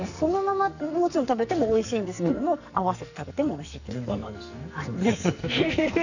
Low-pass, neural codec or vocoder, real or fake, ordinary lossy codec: 7.2 kHz; codec, 44.1 kHz, 2.6 kbps, SNAC; fake; none